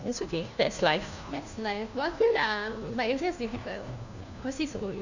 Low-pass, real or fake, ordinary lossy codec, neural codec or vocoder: 7.2 kHz; fake; none; codec, 16 kHz, 1 kbps, FunCodec, trained on LibriTTS, 50 frames a second